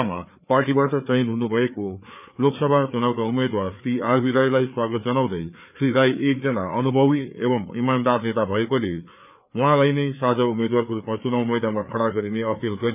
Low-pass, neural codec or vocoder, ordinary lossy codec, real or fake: 3.6 kHz; codec, 16 kHz, 4 kbps, FreqCodec, larger model; none; fake